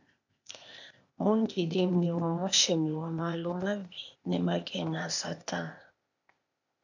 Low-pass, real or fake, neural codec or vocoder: 7.2 kHz; fake; codec, 16 kHz, 0.8 kbps, ZipCodec